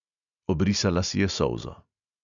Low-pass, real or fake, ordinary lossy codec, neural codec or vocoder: 7.2 kHz; real; none; none